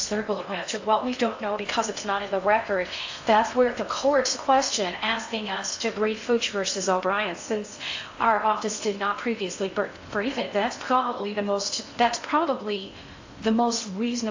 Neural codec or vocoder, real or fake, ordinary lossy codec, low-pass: codec, 16 kHz in and 24 kHz out, 0.6 kbps, FocalCodec, streaming, 4096 codes; fake; AAC, 48 kbps; 7.2 kHz